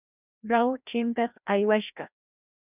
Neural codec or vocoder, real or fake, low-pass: codec, 16 kHz, 1 kbps, FreqCodec, larger model; fake; 3.6 kHz